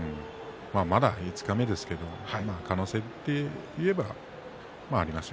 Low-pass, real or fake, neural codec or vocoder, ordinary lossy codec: none; real; none; none